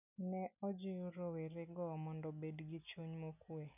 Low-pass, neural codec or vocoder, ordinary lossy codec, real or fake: 3.6 kHz; none; none; real